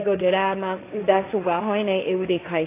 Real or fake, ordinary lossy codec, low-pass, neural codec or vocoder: fake; AAC, 24 kbps; 3.6 kHz; codec, 16 kHz, 1.1 kbps, Voila-Tokenizer